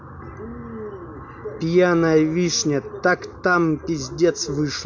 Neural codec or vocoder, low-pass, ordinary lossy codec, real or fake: none; 7.2 kHz; MP3, 64 kbps; real